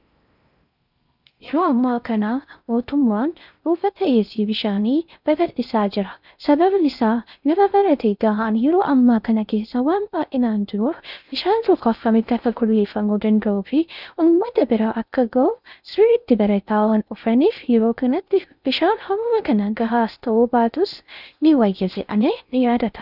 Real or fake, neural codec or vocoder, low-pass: fake; codec, 16 kHz in and 24 kHz out, 0.6 kbps, FocalCodec, streaming, 4096 codes; 5.4 kHz